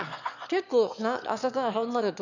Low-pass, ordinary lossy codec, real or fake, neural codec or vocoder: 7.2 kHz; none; fake; autoencoder, 22.05 kHz, a latent of 192 numbers a frame, VITS, trained on one speaker